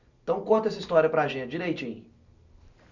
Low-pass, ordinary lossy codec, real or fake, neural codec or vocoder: 7.2 kHz; none; real; none